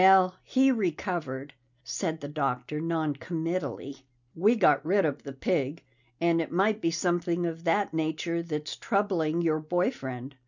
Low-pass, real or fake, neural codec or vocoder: 7.2 kHz; real; none